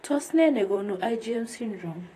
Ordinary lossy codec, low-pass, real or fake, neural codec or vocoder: AAC, 48 kbps; 14.4 kHz; fake; vocoder, 44.1 kHz, 128 mel bands, Pupu-Vocoder